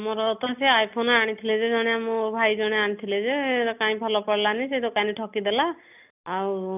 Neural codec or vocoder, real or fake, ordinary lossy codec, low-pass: none; real; none; 3.6 kHz